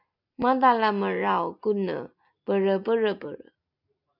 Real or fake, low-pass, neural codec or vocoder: real; 5.4 kHz; none